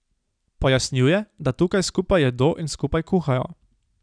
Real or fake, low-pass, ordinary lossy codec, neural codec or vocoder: real; 9.9 kHz; none; none